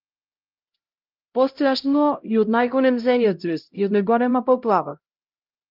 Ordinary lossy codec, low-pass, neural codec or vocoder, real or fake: Opus, 24 kbps; 5.4 kHz; codec, 16 kHz, 0.5 kbps, X-Codec, HuBERT features, trained on LibriSpeech; fake